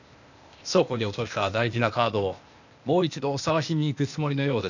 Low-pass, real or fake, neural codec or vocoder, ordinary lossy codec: 7.2 kHz; fake; codec, 16 kHz, 0.8 kbps, ZipCodec; none